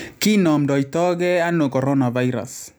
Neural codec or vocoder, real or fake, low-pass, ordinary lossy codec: none; real; none; none